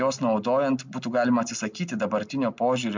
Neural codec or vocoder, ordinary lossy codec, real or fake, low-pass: none; MP3, 64 kbps; real; 7.2 kHz